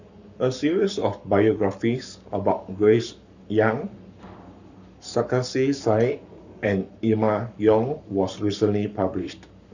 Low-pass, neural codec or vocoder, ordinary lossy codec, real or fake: 7.2 kHz; codec, 44.1 kHz, 7.8 kbps, Pupu-Codec; none; fake